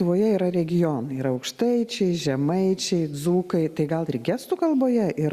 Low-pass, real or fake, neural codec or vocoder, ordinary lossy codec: 14.4 kHz; real; none; Opus, 64 kbps